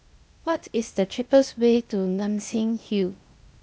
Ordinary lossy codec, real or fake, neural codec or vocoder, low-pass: none; fake; codec, 16 kHz, 0.8 kbps, ZipCodec; none